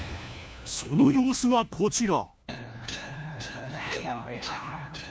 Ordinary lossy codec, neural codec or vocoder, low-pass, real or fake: none; codec, 16 kHz, 1 kbps, FunCodec, trained on LibriTTS, 50 frames a second; none; fake